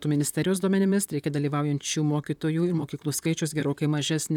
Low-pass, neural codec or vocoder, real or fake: 19.8 kHz; vocoder, 44.1 kHz, 128 mel bands, Pupu-Vocoder; fake